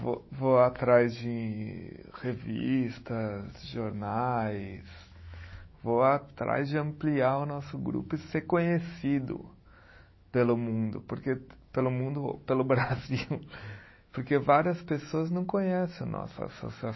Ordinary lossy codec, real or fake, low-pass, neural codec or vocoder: MP3, 24 kbps; fake; 7.2 kHz; autoencoder, 48 kHz, 128 numbers a frame, DAC-VAE, trained on Japanese speech